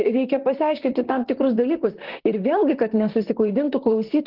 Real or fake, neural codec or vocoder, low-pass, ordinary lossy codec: fake; vocoder, 44.1 kHz, 128 mel bands, Pupu-Vocoder; 5.4 kHz; Opus, 16 kbps